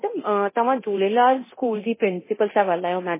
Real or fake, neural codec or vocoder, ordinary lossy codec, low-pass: fake; codec, 24 kHz, 0.9 kbps, DualCodec; MP3, 16 kbps; 3.6 kHz